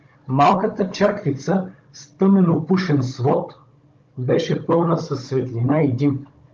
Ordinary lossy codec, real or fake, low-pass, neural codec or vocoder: Opus, 24 kbps; fake; 7.2 kHz; codec, 16 kHz, 16 kbps, FunCodec, trained on Chinese and English, 50 frames a second